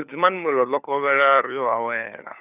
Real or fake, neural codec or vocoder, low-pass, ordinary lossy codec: fake; codec, 16 kHz, 4 kbps, FunCodec, trained on LibriTTS, 50 frames a second; 3.6 kHz; none